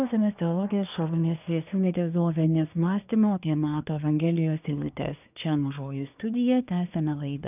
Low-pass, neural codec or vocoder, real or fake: 3.6 kHz; codec, 24 kHz, 1 kbps, SNAC; fake